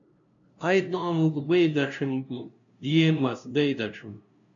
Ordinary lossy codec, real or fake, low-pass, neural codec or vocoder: MP3, 96 kbps; fake; 7.2 kHz; codec, 16 kHz, 0.5 kbps, FunCodec, trained on LibriTTS, 25 frames a second